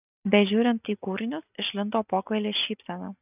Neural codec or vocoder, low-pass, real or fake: none; 3.6 kHz; real